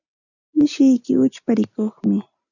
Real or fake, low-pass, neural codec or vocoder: real; 7.2 kHz; none